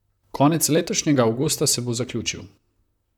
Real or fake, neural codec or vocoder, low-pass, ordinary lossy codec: fake; vocoder, 44.1 kHz, 128 mel bands, Pupu-Vocoder; 19.8 kHz; none